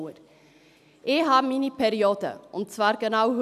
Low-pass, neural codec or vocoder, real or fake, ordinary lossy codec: 14.4 kHz; none; real; none